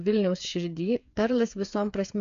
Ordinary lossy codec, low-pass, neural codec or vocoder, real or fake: AAC, 64 kbps; 7.2 kHz; codec, 16 kHz, 8 kbps, FreqCodec, smaller model; fake